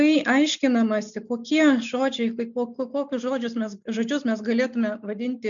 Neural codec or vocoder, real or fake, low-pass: none; real; 7.2 kHz